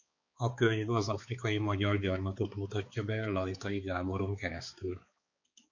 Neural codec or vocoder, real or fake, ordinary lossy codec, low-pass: codec, 16 kHz, 4 kbps, X-Codec, HuBERT features, trained on balanced general audio; fake; MP3, 48 kbps; 7.2 kHz